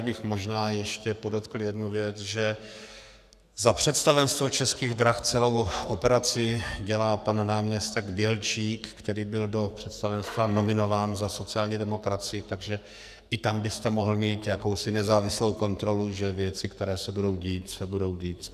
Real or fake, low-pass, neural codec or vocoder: fake; 14.4 kHz; codec, 44.1 kHz, 2.6 kbps, SNAC